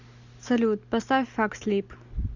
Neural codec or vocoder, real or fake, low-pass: none; real; 7.2 kHz